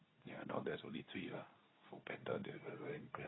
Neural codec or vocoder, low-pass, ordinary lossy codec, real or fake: codec, 24 kHz, 0.9 kbps, WavTokenizer, medium speech release version 2; 7.2 kHz; AAC, 16 kbps; fake